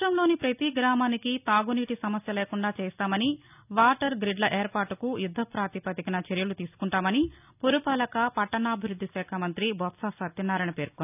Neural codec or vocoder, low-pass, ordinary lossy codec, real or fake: none; 3.6 kHz; none; real